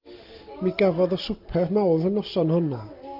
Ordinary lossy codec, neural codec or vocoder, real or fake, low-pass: Opus, 24 kbps; none; real; 5.4 kHz